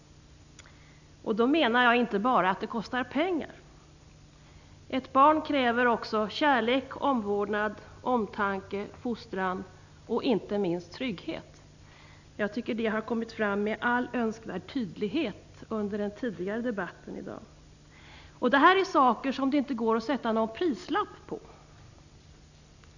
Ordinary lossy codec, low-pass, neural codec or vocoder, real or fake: none; 7.2 kHz; none; real